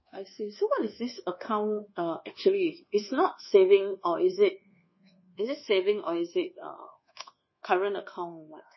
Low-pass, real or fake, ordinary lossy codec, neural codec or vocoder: 7.2 kHz; fake; MP3, 24 kbps; codec, 24 kHz, 1.2 kbps, DualCodec